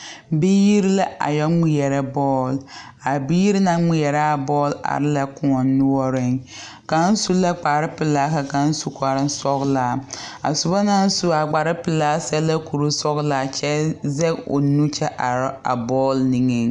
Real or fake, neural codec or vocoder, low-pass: real; none; 9.9 kHz